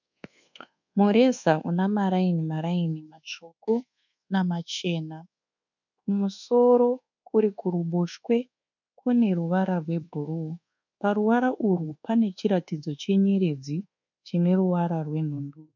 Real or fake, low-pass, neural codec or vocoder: fake; 7.2 kHz; codec, 24 kHz, 1.2 kbps, DualCodec